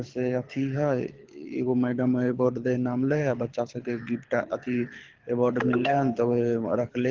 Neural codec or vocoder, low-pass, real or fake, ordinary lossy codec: codec, 24 kHz, 6 kbps, HILCodec; 7.2 kHz; fake; Opus, 16 kbps